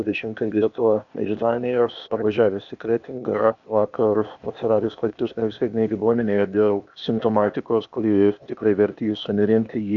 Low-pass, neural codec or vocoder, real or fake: 7.2 kHz; codec, 16 kHz, 0.8 kbps, ZipCodec; fake